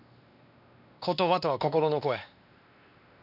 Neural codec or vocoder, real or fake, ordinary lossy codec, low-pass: codec, 16 kHz, 2 kbps, X-Codec, WavLM features, trained on Multilingual LibriSpeech; fake; none; 5.4 kHz